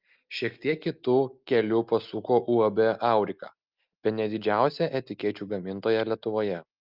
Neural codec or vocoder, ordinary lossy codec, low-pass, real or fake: none; Opus, 24 kbps; 5.4 kHz; real